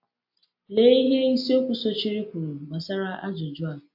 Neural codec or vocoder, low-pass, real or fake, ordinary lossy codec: none; 5.4 kHz; real; AAC, 48 kbps